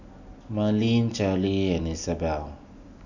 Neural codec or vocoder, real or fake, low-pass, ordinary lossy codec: none; real; 7.2 kHz; none